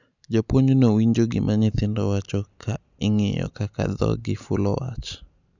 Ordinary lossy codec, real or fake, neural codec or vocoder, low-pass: none; real; none; 7.2 kHz